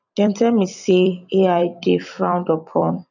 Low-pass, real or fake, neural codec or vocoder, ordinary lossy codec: 7.2 kHz; fake; vocoder, 44.1 kHz, 128 mel bands every 256 samples, BigVGAN v2; none